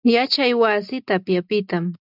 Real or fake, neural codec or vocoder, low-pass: real; none; 5.4 kHz